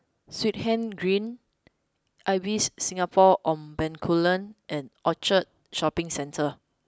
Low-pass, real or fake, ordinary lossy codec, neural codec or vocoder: none; real; none; none